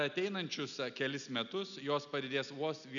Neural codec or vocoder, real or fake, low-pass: none; real; 7.2 kHz